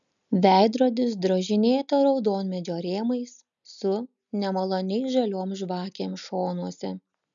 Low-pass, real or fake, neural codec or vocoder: 7.2 kHz; real; none